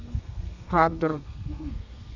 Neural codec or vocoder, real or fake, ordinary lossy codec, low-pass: codec, 32 kHz, 1.9 kbps, SNAC; fake; none; 7.2 kHz